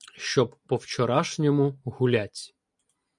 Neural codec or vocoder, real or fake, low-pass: none; real; 10.8 kHz